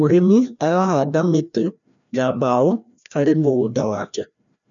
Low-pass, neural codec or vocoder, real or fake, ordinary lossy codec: 7.2 kHz; codec, 16 kHz, 1 kbps, FreqCodec, larger model; fake; none